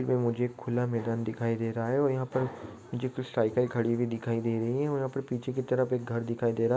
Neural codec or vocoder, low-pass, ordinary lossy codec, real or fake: none; none; none; real